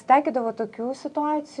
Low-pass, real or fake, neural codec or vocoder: 10.8 kHz; real; none